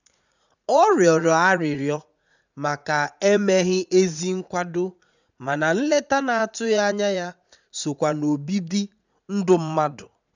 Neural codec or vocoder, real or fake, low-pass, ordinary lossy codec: vocoder, 44.1 kHz, 80 mel bands, Vocos; fake; 7.2 kHz; none